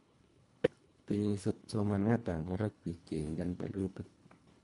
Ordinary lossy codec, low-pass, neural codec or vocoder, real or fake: none; 10.8 kHz; codec, 24 kHz, 1.5 kbps, HILCodec; fake